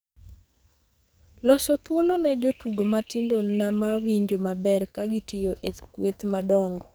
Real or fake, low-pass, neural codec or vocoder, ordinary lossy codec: fake; none; codec, 44.1 kHz, 2.6 kbps, SNAC; none